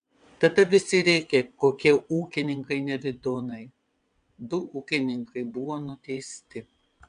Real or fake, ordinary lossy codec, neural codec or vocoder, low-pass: fake; MP3, 64 kbps; vocoder, 22.05 kHz, 80 mel bands, WaveNeXt; 9.9 kHz